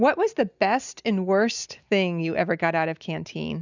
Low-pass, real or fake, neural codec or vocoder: 7.2 kHz; real; none